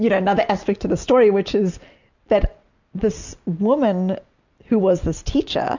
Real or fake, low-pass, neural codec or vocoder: real; 7.2 kHz; none